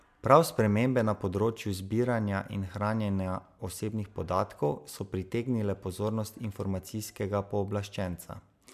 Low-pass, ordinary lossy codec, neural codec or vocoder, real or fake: 14.4 kHz; MP3, 96 kbps; none; real